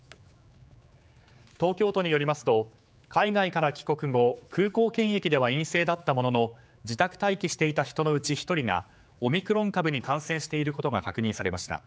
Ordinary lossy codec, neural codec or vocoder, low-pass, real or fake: none; codec, 16 kHz, 4 kbps, X-Codec, HuBERT features, trained on general audio; none; fake